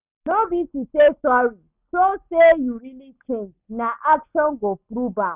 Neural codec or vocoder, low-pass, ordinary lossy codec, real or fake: none; 3.6 kHz; none; real